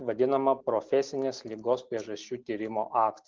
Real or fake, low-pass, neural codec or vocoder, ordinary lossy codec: real; 7.2 kHz; none; Opus, 32 kbps